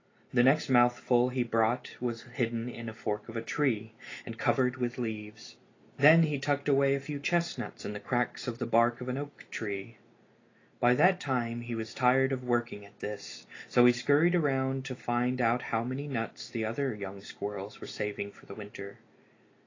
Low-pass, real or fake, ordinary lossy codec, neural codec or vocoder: 7.2 kHz; real; AAC, 32 kbps; none